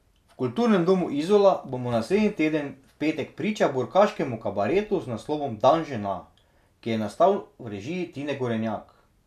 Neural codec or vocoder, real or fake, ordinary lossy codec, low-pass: none; real; none; 14.4 kHz